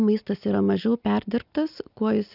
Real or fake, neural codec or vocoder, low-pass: real; none; 5.4 kHz